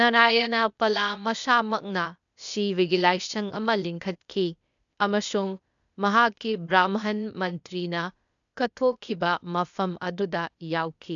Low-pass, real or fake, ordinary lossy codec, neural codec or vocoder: 7.2 kHz; fake; none; codec, 16 kHz, 0.8 kbps, ZipCodec